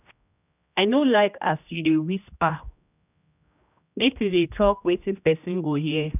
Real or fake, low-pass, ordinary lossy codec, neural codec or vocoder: fake; 3.6 kHz; none; codec, 16 kHz, 1 kbps, X-Codec, HuBERT features, trained on general audio